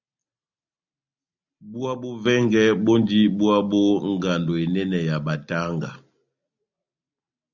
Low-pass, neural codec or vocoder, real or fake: 7.2 kHz; none; real